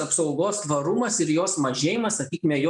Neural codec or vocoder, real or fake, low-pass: none; real; 10.8 kHz